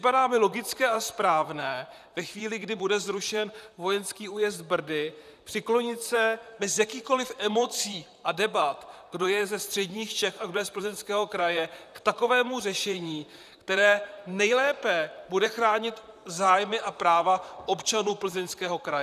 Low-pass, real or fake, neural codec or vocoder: 14.4 kHz; fake; vocoder, 44.1 kHz, 128 mel bands, Pupu-Vocoder